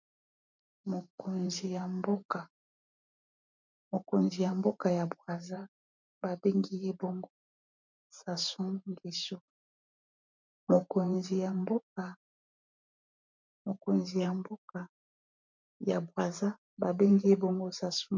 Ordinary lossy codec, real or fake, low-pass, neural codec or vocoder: MP3, 64 kbps; fake; 7.2 kHz; vocoder, 44.1 kHz, 128 mel bands every 512 samples, BigVGAN v2